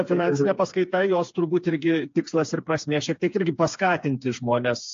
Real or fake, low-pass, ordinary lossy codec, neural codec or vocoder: fake; 7.2 kHz; AAC, 48 kbps; codec, 16 kHz, 4 kbps, FreqCodec, smaller model